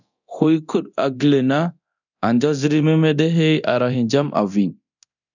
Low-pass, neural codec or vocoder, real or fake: 7.2 kHz; codec, 24 kHz, 0.9 kbps, DualCodec; fake